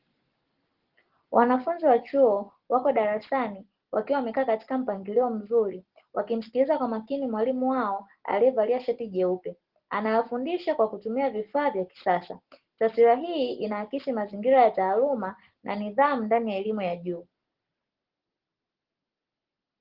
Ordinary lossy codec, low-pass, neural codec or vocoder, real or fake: Opus, 16 kbps; 5.4 kHz; none; real